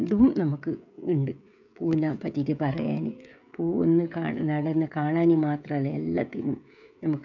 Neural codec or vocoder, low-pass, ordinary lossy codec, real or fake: codec, 16 kHz, 16 kbps, FreqCodec, smaller model; 7.2 kHz; none; fake